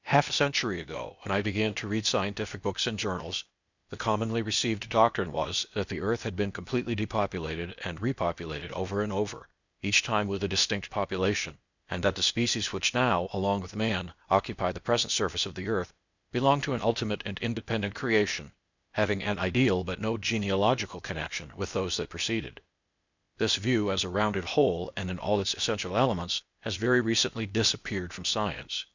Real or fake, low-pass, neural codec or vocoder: fake; 7.2 kHz; codec, 16 kHz, 0.8 kbps, ZipCodec